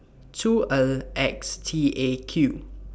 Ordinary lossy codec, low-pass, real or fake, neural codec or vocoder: none; none; real; none